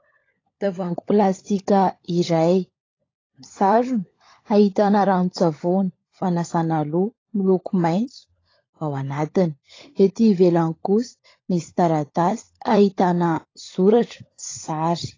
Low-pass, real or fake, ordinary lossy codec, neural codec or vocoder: 7.2 kHz; fake; AAC, 32 kbps; codec, 16 kHz, 16 kbps, FunCodec, trained on LibriTTS, 50 frames a second